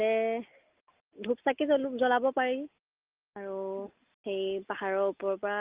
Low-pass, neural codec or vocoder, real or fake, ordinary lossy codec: 3.6 kHz; none; real; Opus, 24 kbps